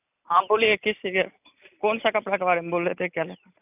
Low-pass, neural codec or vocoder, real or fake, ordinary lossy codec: 3.6 kHz; none; real; none